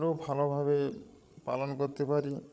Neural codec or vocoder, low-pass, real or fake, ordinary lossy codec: codec, 16 kHz, 16 kbps, FreqCodec, larger model; none; fake; none